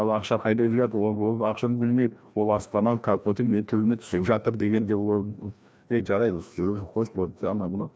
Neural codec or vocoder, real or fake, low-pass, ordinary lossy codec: codec, 16 kHz, 1 kbps, FreqCodec, larger model; fake; none; none